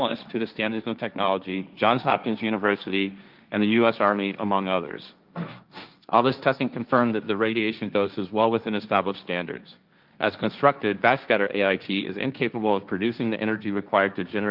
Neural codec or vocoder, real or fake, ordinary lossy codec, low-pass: codec, 16 kHz, 1.1 kbps, Voila-Tokenizer; fake; Opus, 24 kbps; 5.4 kHz